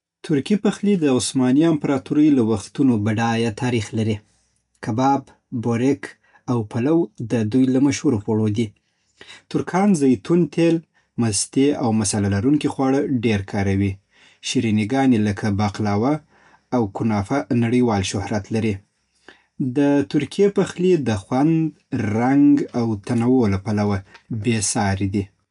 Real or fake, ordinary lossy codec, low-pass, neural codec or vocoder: real; none; 10.8 kHz; none